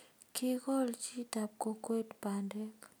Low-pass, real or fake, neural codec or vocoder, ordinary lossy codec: none; real; none; none